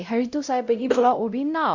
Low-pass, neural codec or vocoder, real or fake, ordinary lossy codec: 7.2 kHz; codec, 16 kHz, 0.5 kbps, X-Codec, WavLM features, trained on Multilingual LibriSpeech; fake; Opus, 64 kbps